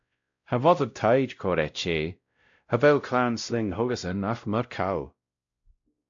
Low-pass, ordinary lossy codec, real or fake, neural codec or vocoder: 7.2 kHz; AAC, 64 kbps; fake; codec, 16 kHz, 0.5 kbps, X-Codec, WavLM features, trained on Multilingual LibriSpeech